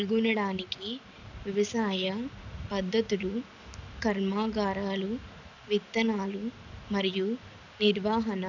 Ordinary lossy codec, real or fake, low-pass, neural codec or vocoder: none; real; 7.2 kHz; none